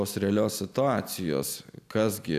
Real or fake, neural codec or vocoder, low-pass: real; none; 14.4 kHz